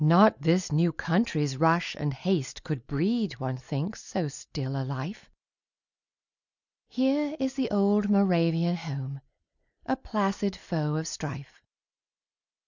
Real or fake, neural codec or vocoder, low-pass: real; none; 7.2 kHz